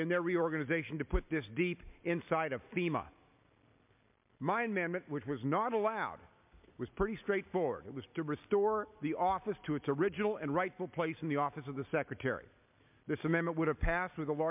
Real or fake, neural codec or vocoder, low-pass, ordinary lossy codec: real; none; 3.6 kHz; MP3, 32 kbps